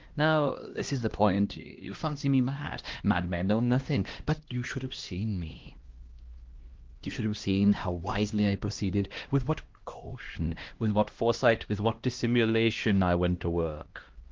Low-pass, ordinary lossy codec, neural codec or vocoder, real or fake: 7.2 kHz; Opus, 16 kbps; codec, 16 kHz, 1 kbps, X-Codec, HuBERT features, trained on LibriSpeech; fake